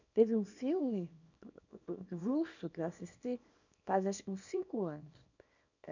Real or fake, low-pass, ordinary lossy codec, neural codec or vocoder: fake; 7.2 kHz; none; codec, 24 kHz, 0.9 kbps, WavTokenizer, small release